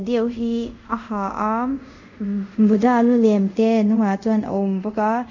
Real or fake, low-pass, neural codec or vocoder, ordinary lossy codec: fake; 7.2 kHz; codec, 24 kHz, 0.5 kbps, DualCodec; none